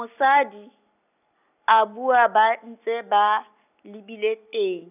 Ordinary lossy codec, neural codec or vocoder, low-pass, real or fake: none; none; 3.6 kHz; real